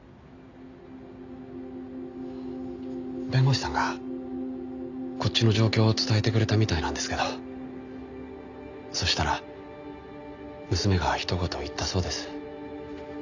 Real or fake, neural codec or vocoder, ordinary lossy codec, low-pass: real; none; none; 7.2 kHz